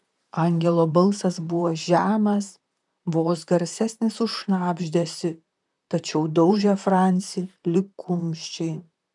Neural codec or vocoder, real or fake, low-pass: vocoder, 44.1 kHz, 128 mel bands, Pupu-Vocoder; fake; 10.8 kHz